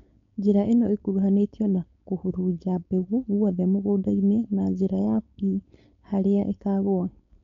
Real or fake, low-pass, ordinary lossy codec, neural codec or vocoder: fake; 7.2 kHz; MP3, 48 kbps; codec, 16 kHz, 4.8 kbps, FACodec